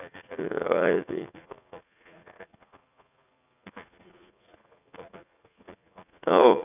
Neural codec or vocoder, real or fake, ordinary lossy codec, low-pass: vocoder, 44.1 kHz, 80 mel bands, Vocos; fake; none; 3.6 kHz